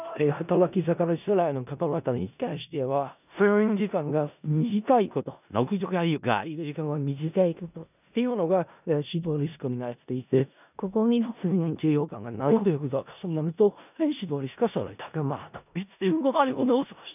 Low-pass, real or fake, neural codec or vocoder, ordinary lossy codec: 3.6 kHz; fake; codec, 16 kHz in and 24 kHz out, 0.4 kbps, LongCat-Audio-Codec, four codebook decoder; none